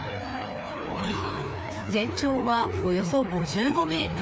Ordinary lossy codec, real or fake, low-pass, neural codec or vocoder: none; fake; none; codec, 16 kHz, 2 kbps, FreqCodec, larger model